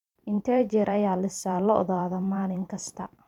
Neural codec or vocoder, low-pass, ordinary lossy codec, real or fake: vocoder, 44.1 kHz, 128 mel bands every 512 samples, BigVGAN v2; 19.8 kHz; none; fake